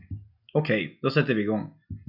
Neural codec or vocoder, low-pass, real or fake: none; 5.4 kHz; real